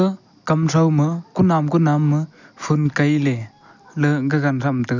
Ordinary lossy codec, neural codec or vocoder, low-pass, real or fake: none; none; 7.2 kHz; real